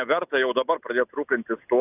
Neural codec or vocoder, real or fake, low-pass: none; real; 3.6 kHz